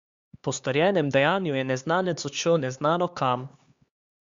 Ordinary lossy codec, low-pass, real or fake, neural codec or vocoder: Opus, 64 kbps; 7.2 kHz; fake; codec, 16 kHz, 4 kbps, X-Codec, HuBERT features, trained on LibriSpeech